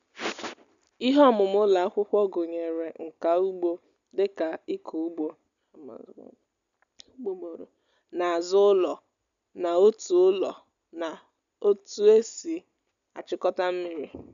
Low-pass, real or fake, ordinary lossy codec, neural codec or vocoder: 7.2 kHz; real; none; none